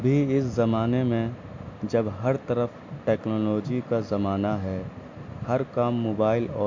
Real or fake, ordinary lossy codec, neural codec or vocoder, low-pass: real; MP3, 48 kbps; none; 7.2 kHz